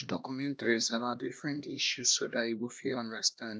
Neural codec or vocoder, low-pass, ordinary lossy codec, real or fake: codec, 16 kHz, 1 kbps, X-Codec, HuBERT features, trained on LibriSpeech; none; none; fake